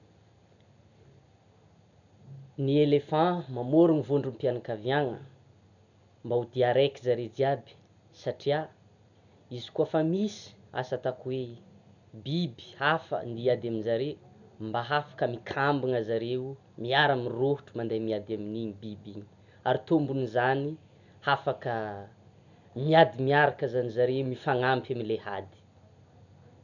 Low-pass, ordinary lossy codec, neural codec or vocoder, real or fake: 7.2 kHz; none; none; real